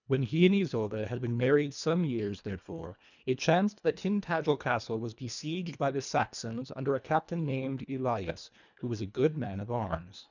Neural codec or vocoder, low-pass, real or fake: codec, 24 kHz, 1.5 kbps, HILCodec; 7.2 kHz; fake